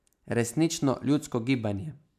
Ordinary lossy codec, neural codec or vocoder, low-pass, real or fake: none; none; 14.4 kHz; real